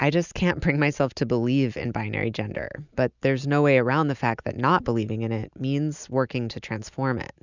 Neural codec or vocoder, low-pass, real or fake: none; 7.2 kHz; real